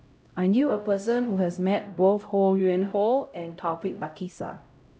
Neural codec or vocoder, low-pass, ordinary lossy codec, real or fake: codec, 16 kHz, 0.5 kbps, X-Codec, HuBERT features, trained on LibriSpeech; none; none; fake